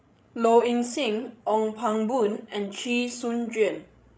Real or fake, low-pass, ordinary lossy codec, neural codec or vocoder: fake; none; none; codec, 16 kHz, 16 kbps, FreqCodec, larger model